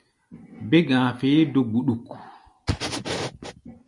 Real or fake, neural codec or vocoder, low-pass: real; none; 10.8 kHz